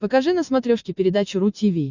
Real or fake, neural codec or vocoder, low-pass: real; none; 7.2 kHz